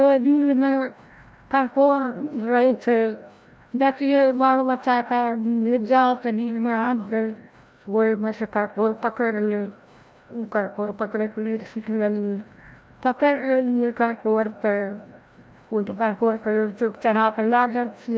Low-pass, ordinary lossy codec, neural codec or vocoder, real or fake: none; none; codec, 16 kHz, 0.5 kbps, FreqCodec, larger model; fake